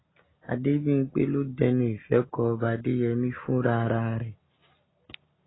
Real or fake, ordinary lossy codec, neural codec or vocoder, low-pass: real; AAC, 16 kbps; none; 7.2 kHz